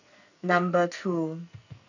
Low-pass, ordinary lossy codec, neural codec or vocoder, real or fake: 7.2 kHz; none; codec, 44.1 kHz, 2.6 kbps, SNAC; fake